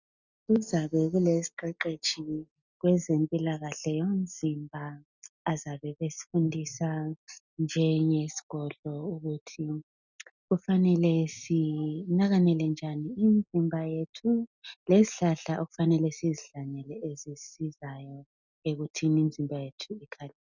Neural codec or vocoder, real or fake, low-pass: none; real; 7.2 kHz